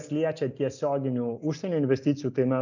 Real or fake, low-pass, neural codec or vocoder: real; 7.2 kHz; none